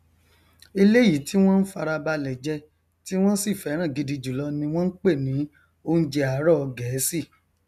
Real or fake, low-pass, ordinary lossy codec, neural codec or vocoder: real; 14.4 kHz; none; none